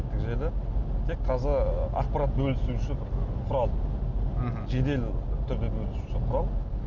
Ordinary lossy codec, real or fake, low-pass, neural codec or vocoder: none; real; 7.2 kHz; none